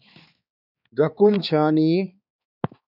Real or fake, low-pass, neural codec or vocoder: fake; 5.4 kHz; codec, 16 kHz, 4 kbps, X-Codec, HuBERT features, trained on balanced general audio